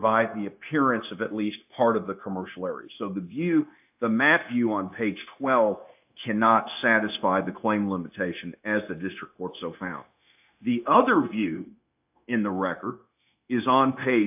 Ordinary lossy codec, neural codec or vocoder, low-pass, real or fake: AAC, 32 kbps; codec, 16 kHz, 0.9 kbps, LongCat-Audio-Codec; 3.6 kHz; fake